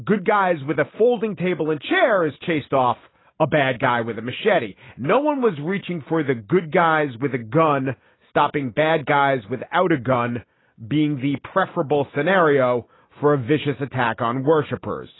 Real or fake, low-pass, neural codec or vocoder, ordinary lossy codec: real; 7.2 kHz; none; AAC, 16 kbps